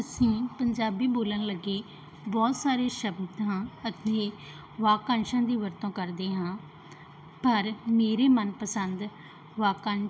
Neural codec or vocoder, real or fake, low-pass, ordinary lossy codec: none; real; none; none